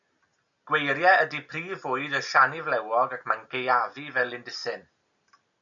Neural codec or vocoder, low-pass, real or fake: none; 7.2 kHz; real